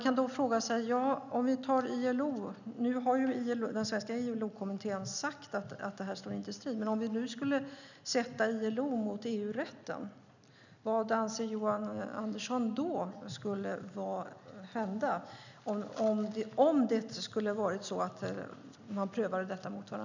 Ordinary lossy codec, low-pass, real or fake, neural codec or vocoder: none; 7.2 kHz; real; none